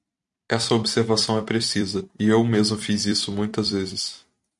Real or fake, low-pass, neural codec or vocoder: fake; 10.8 kHz; vocoder, 44.1 kHz, 128 mel bands every 256 samples, BigVGAN v2